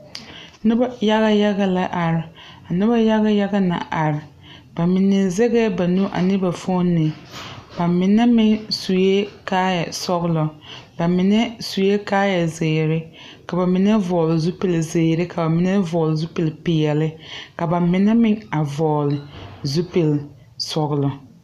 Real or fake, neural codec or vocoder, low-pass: real; none; 14.4 kHz